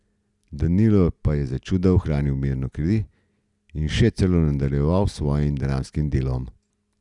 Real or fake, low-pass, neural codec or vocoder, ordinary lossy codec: real; 10.8 kHz; none; none